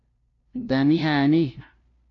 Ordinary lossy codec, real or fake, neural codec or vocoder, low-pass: AAC, 48 kbps; fake; codec, 16 kHz, 0.5 kbps, FunCodec, trained on LibriTTS, 25 frames a second; 7.2 kHz